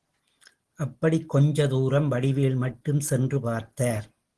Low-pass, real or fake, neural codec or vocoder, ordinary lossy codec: 10.8 kHz; real; none; Opus, 16 kbps